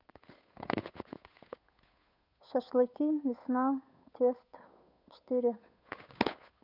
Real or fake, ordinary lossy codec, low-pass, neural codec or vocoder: fake; none; 5.4 kHz; codec, 16 kHz, 8 kbps, FunCodec, trained on Chinese and English, 25 frames a second